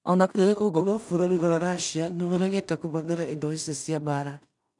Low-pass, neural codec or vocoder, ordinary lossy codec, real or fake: 10.8 kHz; codec, 16 kHz in and 24 kHz out, 0.4 kbps, LongCat-Audio-Codec, two codebook decoder; none; fake